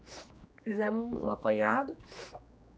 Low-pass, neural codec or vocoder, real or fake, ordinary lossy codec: none; codec, 16 kHz, 2 kbps, X-Codec, HuBERT features, trained on general audio; fake; none